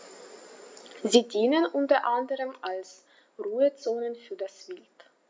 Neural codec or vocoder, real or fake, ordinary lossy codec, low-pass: none; real; none; none